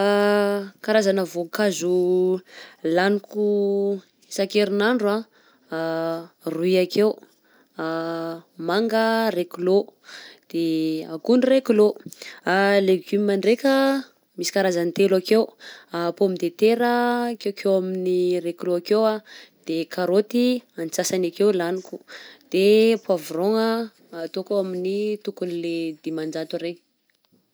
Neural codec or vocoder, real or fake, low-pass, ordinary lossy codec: none; real; none; none